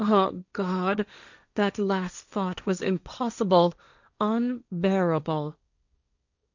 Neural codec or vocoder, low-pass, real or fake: codec, 16 kHz, 1.1 kbps, Voila-Tokenizer; 7.2 kHz; fake